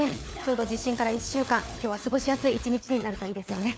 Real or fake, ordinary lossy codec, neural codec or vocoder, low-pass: fake; none; codec, 16 kHz, 4 kbps, FunCodec, trained on LibriTTS, 50 frames a second; none